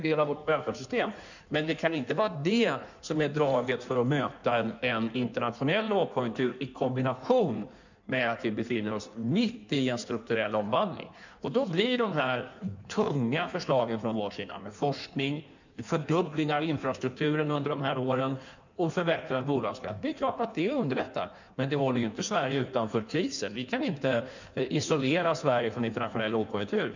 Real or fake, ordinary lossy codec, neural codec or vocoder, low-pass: fake; none; codec, 16 kHz in and 24 kHz out, 1.1 kbps, FireRedTTS-2 codec; 7.2 kHz